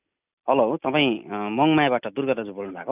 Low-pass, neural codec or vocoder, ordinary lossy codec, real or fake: 3.6 kHz; none; none; real